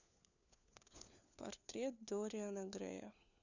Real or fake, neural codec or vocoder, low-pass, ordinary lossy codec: fake; codec, 16 kHz, 4 kbps, FunCodec, trained on LibriTTS, 50 frames a second; 7.2 kHz; none